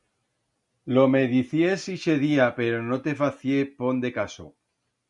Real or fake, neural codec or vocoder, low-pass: real; none; 10.8 kHz